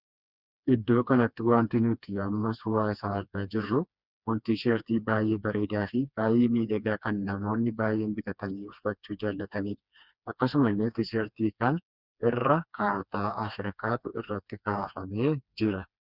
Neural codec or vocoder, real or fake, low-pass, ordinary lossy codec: codec, 16 kHz, 2 kbps, FreqCodec, smaller model; fake; 5.4 kHz; Opus, 64 kbps